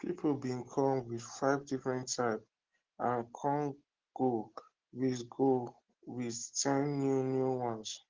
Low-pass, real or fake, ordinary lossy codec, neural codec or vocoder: 7.2 kHz; real; Opus, 16 kbps; none